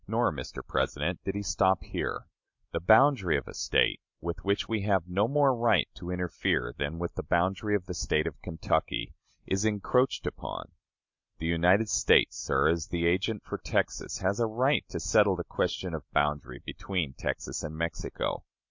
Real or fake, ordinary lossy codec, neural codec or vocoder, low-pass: real; MP3, 48 kbps; none; 7.2 kHz